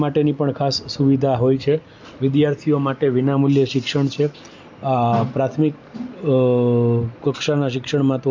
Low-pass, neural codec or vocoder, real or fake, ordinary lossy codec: 7.2 kHz; none; real; MP3, 64 kbps